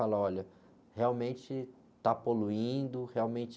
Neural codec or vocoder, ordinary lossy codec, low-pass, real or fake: none; none; none; real